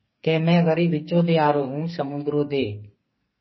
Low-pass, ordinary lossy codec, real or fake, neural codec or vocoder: 7.2 kHz; MP3, 24 kbps; fake; codec, 44.1 kHz, 2.6 kbps, SNAC